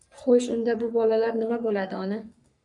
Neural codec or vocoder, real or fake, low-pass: codec, 44.1 kHz, 3.4 kbps, Pupu-Codec; fake; 10.8 kHz